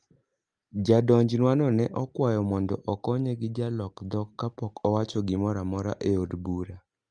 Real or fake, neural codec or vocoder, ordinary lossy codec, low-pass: real; none; Opus, 32 kbps; 9.9 kHz